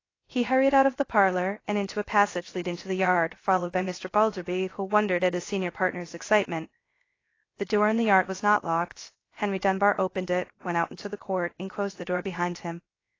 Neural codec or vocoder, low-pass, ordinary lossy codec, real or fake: codec, 16 kHz, 0.3 kbps, FocalCodec; 7.2 kHz; AAC, 32 kbps; fake